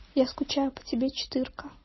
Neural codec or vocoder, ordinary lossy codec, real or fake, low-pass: none; MP3, 24 kbps; real; 7.2 kHz